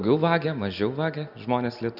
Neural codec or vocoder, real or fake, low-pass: none; real; 5.4 kHz